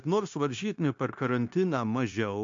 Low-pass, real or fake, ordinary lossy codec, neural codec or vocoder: 7.2 kHz; fake; MP3, 48 kbps; codec, 16 kHz, 0.9 kbps, LongCat-Audio-Codec